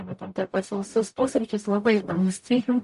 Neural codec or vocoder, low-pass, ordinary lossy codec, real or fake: codec, 44.1 kHz, 0.9 kbps, DAC; 14.4 kHz; MP3, 48 kbps; fake